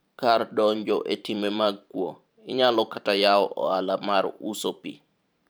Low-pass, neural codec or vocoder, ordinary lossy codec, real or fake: none; vocoder, 44.1 kHz, 128 mel bands every 512 samples, BigVGAN v2; none; fake